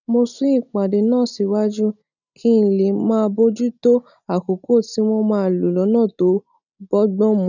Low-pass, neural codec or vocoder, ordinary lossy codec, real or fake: 7.2 kHz; none; none; real